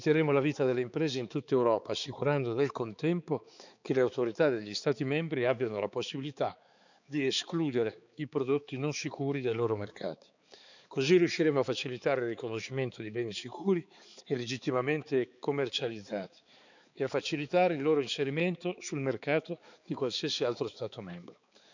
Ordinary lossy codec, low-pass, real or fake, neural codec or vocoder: none; 7.2 kHz; fake; codec, 16 kHz, 4 kbps, X-Codec, HuBERT features, trained on balanced general audio